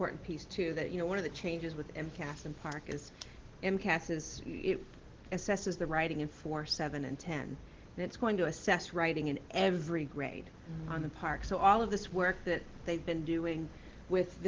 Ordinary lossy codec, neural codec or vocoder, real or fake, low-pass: Opus, 16 kbps; none; real; 7.2 kHz